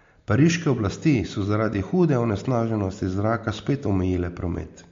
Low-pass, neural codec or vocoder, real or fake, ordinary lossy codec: 7.2 kHz; none; real; MP3, 48 kbps